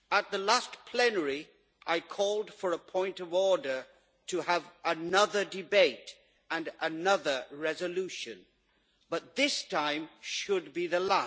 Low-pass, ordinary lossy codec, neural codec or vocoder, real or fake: none; none; none; real